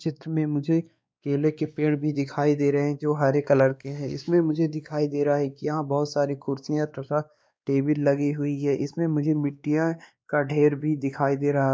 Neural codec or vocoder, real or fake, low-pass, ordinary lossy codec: codec, 16 kHz, 2 kbps, X-Codec, WavLM features, trained on Multilingual LibriSpeech; fake; none; none